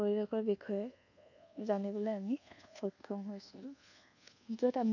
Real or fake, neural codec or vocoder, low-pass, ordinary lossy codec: fake; codec, 24 kHz, 1.2 kbps, DualCodec; 7.2 kHz; none